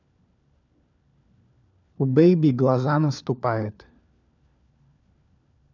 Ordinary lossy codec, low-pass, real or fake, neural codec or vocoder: none; 7.2 kHz; fake; codec, 16 kHz, 4 kbps, FunCodec, trained on LibriTTS, 50 frames a second